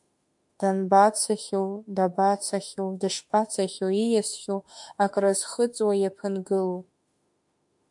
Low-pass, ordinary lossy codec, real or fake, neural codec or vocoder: 10.8 kHz; MP3, 64 kbps; fake; autoencoder, 48 kHz, 32 numbers a frame, DAC-VAE, trained on Japanese speech